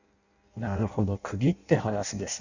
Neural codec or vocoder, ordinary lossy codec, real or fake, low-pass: codec, 16 kHz in and 24 kHz out, 0.6 kbps, FireRedTTS-2 codec; none; fake; 7.2 kHz